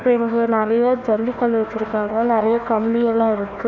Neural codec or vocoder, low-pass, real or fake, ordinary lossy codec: codec, 16 kHz, 1 kbps, FunCodec, trained on Chinese and English, 50 frames a second; 7.2 kHz; fake; none